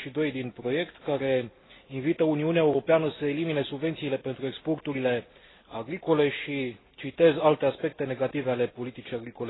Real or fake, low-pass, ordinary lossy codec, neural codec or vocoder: real; 7.2 kHz; AAC, 16 kbps; none